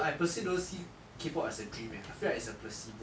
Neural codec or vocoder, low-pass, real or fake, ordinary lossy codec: none; none; real; none